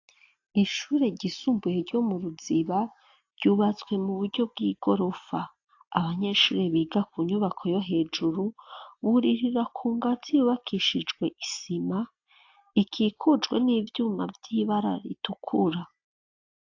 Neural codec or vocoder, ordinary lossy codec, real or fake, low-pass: none; AAC, 48 kbps; real; 7.2 kHz